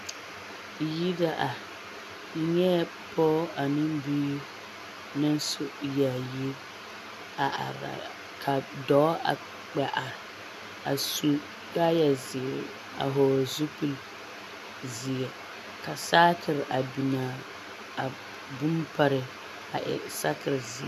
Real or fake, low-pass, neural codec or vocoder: real; 14.4 kHz; none